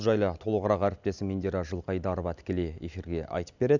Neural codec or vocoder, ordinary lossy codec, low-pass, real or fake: none; none; 7.2 kHz; real